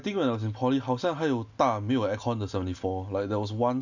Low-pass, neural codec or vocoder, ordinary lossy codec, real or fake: 7.2 kHz; none; none; real